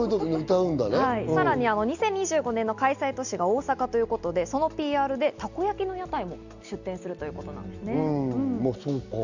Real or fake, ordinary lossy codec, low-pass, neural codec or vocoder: real; Opus, 64 kbps; 7.2 kHz; none